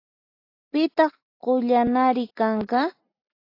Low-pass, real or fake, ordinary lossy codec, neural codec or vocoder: 5.4 kHz; real; AAC, 32 kbps; none